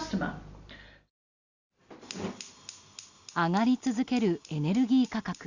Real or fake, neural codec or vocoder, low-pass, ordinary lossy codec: real; none; 7.2 kHz; Opus, 64 kbps